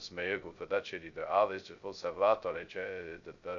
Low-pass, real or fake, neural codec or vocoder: 7.2 kHz; fake; codec, 16 kHz, 0.2 kbps, FocalCodec